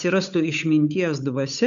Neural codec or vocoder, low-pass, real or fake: codec, 16 kHz, 8 kbps, FunCodec, trained on Chinese and English, 25 frames a second; 7.2 kHz; fake